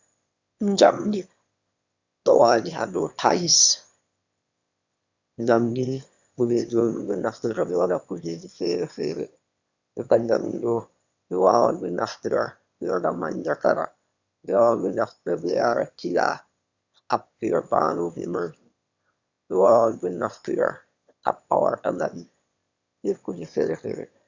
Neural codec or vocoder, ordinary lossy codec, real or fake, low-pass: autoencoder, 22.05 kHz, a latent of 192 numbers a frame, VITS, trained on one speaker; Opus, 64 kbps; fake; 7.2 kHz